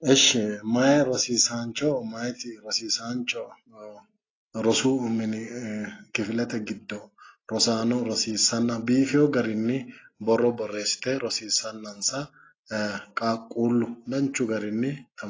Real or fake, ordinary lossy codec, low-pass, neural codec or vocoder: real; AAC, 32 kbps; 7.2 kHz; none